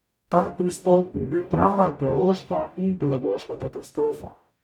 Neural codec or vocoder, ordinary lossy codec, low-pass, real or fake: codec, 44.1 kHz, 0.9 kbps, DAC; none; 19.8 kHz; fake